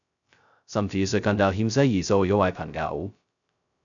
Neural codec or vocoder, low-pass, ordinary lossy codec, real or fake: codec, 16 kHz, 0.2 kbps, FocalCodec; 7.2 kHz; MP3, 96 kbps; fake